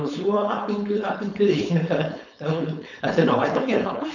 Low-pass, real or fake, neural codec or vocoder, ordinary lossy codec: 7.2 kHz; fake; codec, 16 kHz, 4.8 kbps, FACodec; none